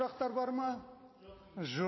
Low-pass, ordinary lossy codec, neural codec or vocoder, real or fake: 7.2 kHz; MP3, 24 kbps; none; real